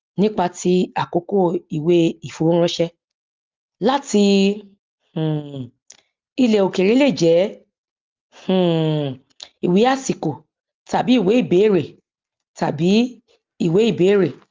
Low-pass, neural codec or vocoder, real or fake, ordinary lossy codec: 7.2 kHz; none; real; Opus, 32 kbps